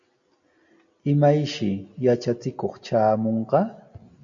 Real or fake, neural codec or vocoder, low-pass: real; none; 7.2 kHz